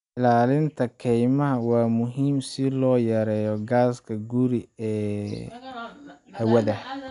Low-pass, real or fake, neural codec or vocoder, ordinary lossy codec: 10.8 kHz; real; none; none